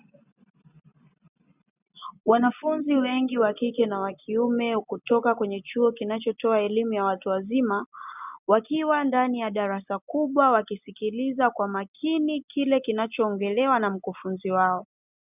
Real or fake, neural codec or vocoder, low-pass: real; none; 3.6 kHz